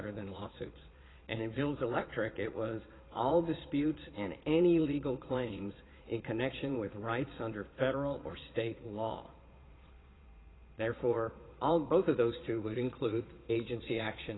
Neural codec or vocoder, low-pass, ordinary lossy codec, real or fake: vocoder, 22.05 kHz, 80 mel bands, Vocos; 7.2 kHz; AAC, 16 kbps; fake